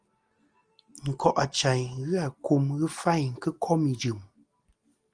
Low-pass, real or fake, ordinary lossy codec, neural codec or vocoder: 9.9 kHz; real; Opus, 24 kbps; none